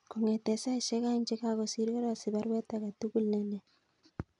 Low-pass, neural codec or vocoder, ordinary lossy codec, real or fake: 10.8 kHz; none; none; real